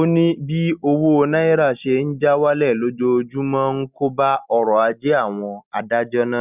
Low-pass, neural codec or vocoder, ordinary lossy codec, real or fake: 3.6 kHz; none; none; real